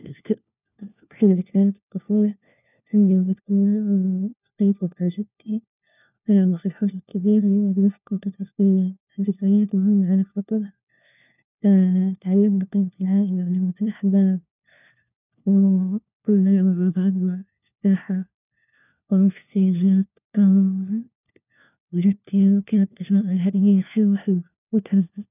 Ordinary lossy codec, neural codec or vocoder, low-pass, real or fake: none; codec, 16 kHz, 1 kbps, FunCodec, trained on LibriTTS, 50 frames a second; 3.6 kHz; fake